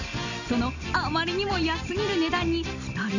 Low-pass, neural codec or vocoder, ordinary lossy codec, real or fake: 7.2 kHz; none; none; real